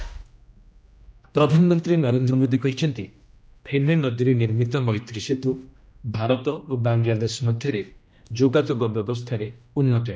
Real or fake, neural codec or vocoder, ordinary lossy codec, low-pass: fake; codec, 16 kHz, 1 kbps, X-Codec, HuBERT features, trained on general audio; none; none